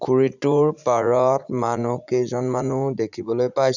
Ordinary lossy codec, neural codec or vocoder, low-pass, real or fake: none; vocoder, 44.1 kHz, 80 mel bands, Vocos; 7.2 kHz; fake